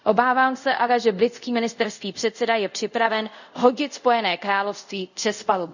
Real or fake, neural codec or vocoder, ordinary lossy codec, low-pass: fake; codec, 24 kHz, 0.5 kbps, DualCodec; none; 7.2 kHz